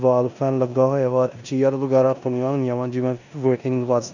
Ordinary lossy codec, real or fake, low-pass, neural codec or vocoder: none; fake; 7.2 kHz; codec, 16 kHz in and 24 kHz out, 0.9 kbps, LongCat-Audio-Codec, four codebook decoder